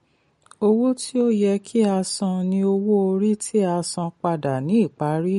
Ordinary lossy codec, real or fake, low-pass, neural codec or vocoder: MP3, 48 kbps; real; 19.8 kHz; none